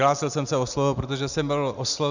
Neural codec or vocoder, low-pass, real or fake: none; 7.2 kHz; real